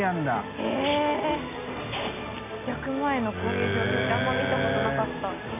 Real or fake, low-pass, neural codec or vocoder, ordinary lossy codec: real; 3.6 kHz; none; none